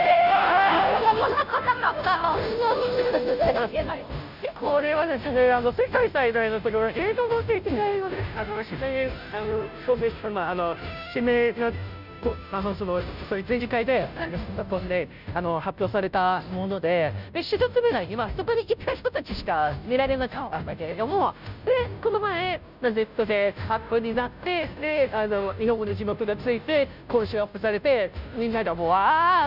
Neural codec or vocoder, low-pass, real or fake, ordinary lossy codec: codec, 16 kHz, 0.5 kbps, FunCodec, trained on Chinese and English, 25 frames a second; 5.4 kHz; fake; none